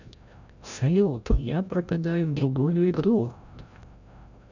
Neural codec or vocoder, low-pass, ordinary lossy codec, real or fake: codec, 16 kHz, 0.5 kbps, FreqCodec, larger model; 7.2 kHz; none; fake